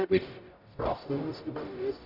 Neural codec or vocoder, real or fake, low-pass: codec, 44.1 kHz, 0.9 kbps, DAC; fake; 5.4 kHz